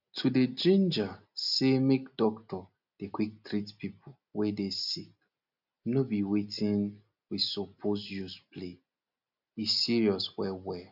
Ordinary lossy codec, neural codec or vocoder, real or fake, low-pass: none; none; real; 5.4 kHz